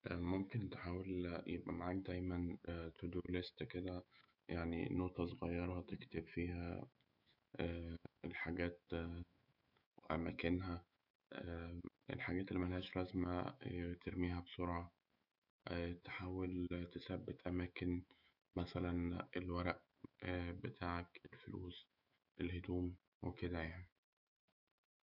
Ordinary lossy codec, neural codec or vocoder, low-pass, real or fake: none; autoencoder, 48 kHz, 128 numbers a frame, DAC-VAE, trained on Japanese speech; 5.4 kHz; fake